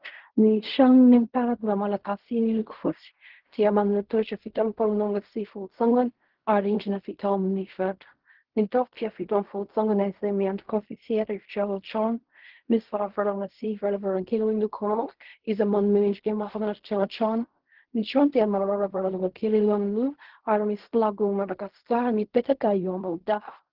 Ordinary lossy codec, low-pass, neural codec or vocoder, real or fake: Opus, 16 kbps; 5.4 kHz; codec, 16 kHz in and 24 kHz out, 0.4 kbps, LongCat-Audio-Codec, fine tuned four codebook decoder; fake